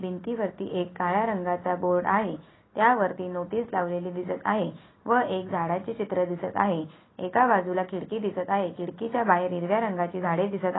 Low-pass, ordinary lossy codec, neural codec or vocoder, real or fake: 7.2 kHz; AAC, 16 kbps; none; real